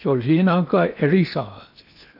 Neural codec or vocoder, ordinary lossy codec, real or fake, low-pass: codec, 16 kHz in and 24 kHz out, 0.8 kbps, FocalCodec, streaming, 65536 codes; none; fake; 5.4 kHz